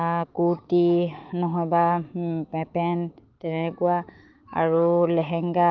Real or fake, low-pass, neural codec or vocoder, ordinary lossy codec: fake; 7.2 kHz; autoencoder, 48 kHz, 128 numbers a frame, DAC-VAE, trained on Japanese speech; Opus, 32 kbps